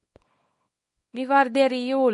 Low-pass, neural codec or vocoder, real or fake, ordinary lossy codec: 10.8 kHz; codec, 24 kHz, 0.9 kbps, WavTokenizer, small release; fake; MP3, 48 kbps